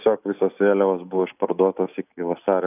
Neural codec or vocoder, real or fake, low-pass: none; real; 3.6 kHz